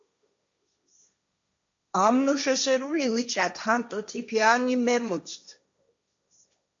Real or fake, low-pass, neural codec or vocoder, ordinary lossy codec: fake; 7.2 kHz; codec, 16 kHz, 1.1 kbps, Voila-Tokenizer; AAC, 64 kbps